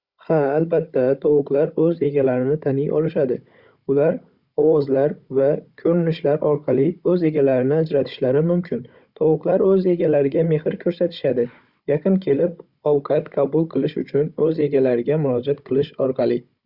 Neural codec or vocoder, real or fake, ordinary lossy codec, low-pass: codec, 16 kHz, 16 kbps, FunCodec, trained on Chinese and English, 50 frames a second; fake; Opus, 64 kbps; 5.4 kHz